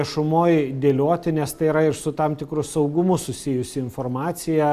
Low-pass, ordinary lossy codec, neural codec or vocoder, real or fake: 14.4 kHz; Opus, 64 kbps; none; real